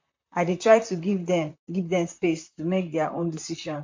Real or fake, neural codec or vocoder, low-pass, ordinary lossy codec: fake; vocoder, 44.1 kHz, 128 mel bands, Pupu-Vocoder; 7.2 kHz; MP3, 48 kbps